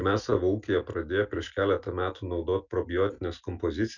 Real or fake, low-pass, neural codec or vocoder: real; 7.2 kHz; none